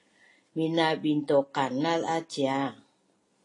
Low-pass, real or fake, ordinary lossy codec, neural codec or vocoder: 10.8 kHz; real; AAC, 32 kbps; none